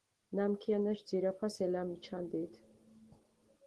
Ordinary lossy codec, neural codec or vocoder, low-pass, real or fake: Opus, 16 kbps; none; 10.8 kHz; real